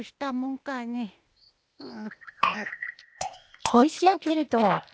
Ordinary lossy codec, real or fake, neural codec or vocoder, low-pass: none; fake; codec, 16 kHz, 0.8 kbps, ZipCodec; none